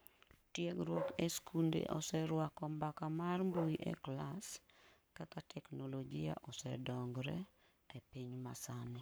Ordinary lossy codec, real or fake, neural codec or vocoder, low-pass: none; fake; codec, 44.1 kHz, 7.8 kbps, Pupu-Codec; none